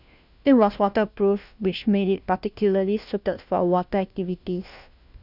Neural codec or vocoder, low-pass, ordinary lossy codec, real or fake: codec, 16 kHz, 1 kbps, FunCodec, trained on LibriTTS, 50 frames a second; 5.4 kHz; none; fake